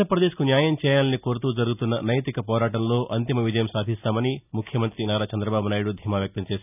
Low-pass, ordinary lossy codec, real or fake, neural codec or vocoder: 3.6 kHz; none; real; none